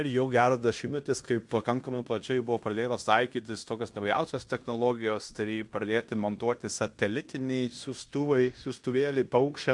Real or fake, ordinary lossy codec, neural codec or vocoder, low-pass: fake; MP3, 64 kbps; codec, 16 kHz in and 24 kHz out, 0.9 kbps, LongCat-Audio-Codec, fine tuned four codebook decoder; 10.8 kHz